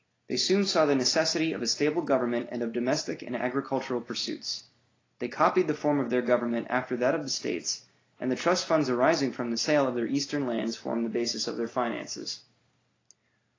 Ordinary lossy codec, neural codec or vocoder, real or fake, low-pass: AAC, 32 kbps; none; real; 7.2 kHz